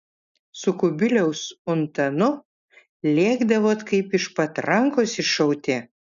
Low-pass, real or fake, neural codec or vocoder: 7.2 kHz; real; none